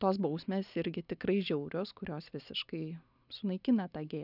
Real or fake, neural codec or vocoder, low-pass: real; none; 5.4 kHz